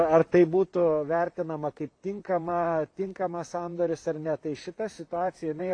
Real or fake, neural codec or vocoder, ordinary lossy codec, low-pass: fake; vocoder, 44.1 kHz, 128 mel bands, Pupu-Vocoder; AAC, 48 kbps; 9.9 kHz